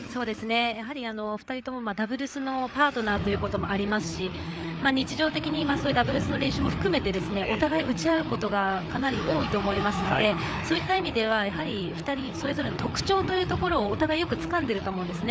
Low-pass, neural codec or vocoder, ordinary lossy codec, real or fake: none; codec, 16 kHz, 4 kbps, FreqCodec, larger model; none; fake